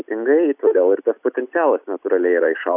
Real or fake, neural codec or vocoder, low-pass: real; none; 3.6 kHz